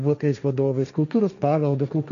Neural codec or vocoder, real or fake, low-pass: codec, 16 kHz, 1.1 kbps, Voila-Tokenizer; fake; 7.2 kHz